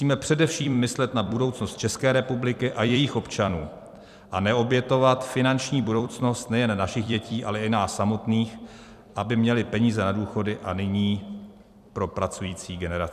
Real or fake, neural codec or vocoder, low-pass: fake; vocoder, 44.1 kHz, 128 mel bands every 256 samples, BigVGAN v2; 14.4 kHz